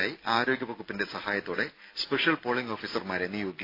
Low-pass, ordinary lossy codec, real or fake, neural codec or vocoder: 5.4 kHz; AAC, 32 kbps; real; none